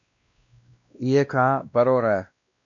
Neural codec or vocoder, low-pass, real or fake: codec, 16 kHz, 1 kbps, X-Codec, WavLM features, trained on Multilingual LibriSpeech; 7.2 kHz; fake